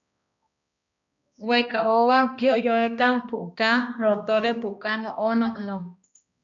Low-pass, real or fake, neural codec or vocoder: 7.2 kHz; fake; codec, 16 kHz, 1 kbps, X-Codec, HuBERT features, trained on balanced general audio